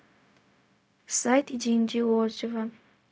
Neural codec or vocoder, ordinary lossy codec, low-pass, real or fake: codec, 16 kHz, 0.4 kbps, LongCat-Audio-Codec; none; none; fake